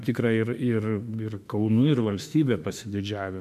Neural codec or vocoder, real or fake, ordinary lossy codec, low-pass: autoencoder, 48 kHz, 32 numbers a frame, DAC-VAE, trained on Japanese speech; fake; MP3, 96 kbps; 14.4 kHz